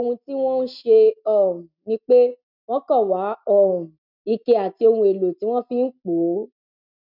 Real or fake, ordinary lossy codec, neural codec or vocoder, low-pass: real; none; none; 5.4 kHz